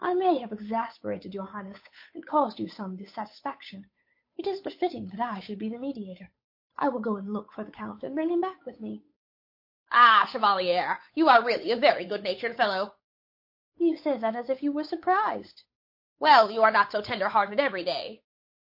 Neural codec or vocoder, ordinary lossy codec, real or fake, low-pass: codec, 16 kHz, 8 kbps, FunCodec, trained on Chinese and English, 25 frames a second; MP3, 32 kbps; fake; 5.4 kHz